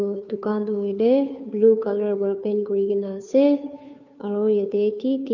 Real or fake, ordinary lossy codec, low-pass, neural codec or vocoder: fake; none; 7.2 kHz; codec, 16 kHz, 2 kbps, FunCodec, trained on Chinese and English, 25 frames a second